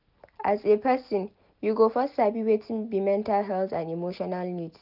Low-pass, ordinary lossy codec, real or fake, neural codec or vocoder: 5.4 kHz; none; real; none